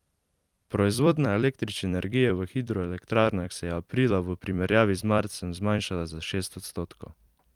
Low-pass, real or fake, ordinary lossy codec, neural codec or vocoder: 19.8 kHz; fake; Opus, 32 kbps; vocoder, 44.1 kHz, 128 mel bands every 256 samples, BigVGAN v2